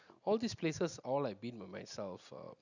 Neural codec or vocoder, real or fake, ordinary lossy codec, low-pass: none; real; none; 7.2 kHz